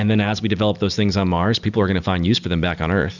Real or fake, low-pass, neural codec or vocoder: real; 7.2 kHz; none